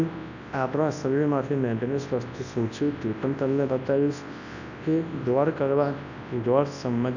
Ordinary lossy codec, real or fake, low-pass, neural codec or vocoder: none; fake; 7.2 kHz; codec, 24 kHz, 0.9 kbps, WavTokenizer, large speech release